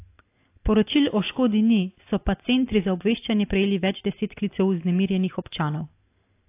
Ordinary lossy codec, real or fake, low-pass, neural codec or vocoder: AAC, 24 kbps; real; 3.6 kHz; none